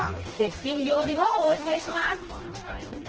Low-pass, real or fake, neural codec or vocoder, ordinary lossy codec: 7.2 kHz; fake; codec, 16 kHz, 1 kbps, FreqCodec, smaller model; Opus, 16 kbps